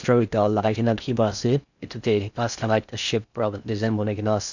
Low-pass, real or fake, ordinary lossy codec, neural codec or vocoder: 7.2 kHz; fake; none; codec, 16 kHz in and 24 kHz out, 0.6 kbps, FocalCodec, streaming, 4096 codes